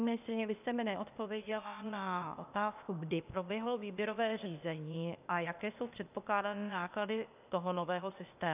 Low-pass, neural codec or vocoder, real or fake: 3.6 kHz; codec, 16 kHz, 0.8 kbps, ZipCodec; fake